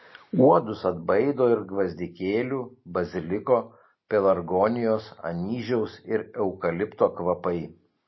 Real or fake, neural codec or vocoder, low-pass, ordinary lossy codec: real; none; 7.2 kHz; MP3, 24 kbps